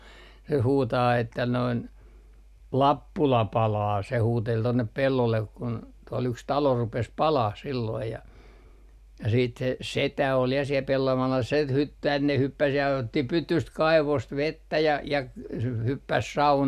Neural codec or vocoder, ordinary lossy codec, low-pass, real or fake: none; none; 14.4 kHz; real